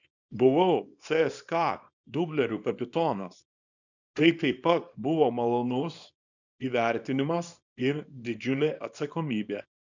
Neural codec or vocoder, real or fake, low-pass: codec, 24 kHz, 0.9 kbps, WavTokenizer, small release; fake; 7.2 kHz